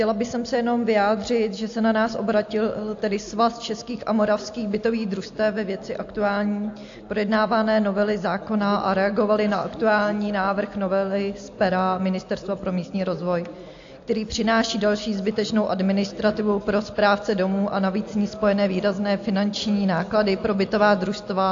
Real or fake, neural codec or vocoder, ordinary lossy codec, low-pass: real; none; AAC, 48 kbps; 7.2 kHz